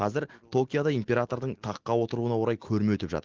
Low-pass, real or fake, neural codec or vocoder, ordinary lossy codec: 7.2 kHz; real; none; Opus, 16 kbps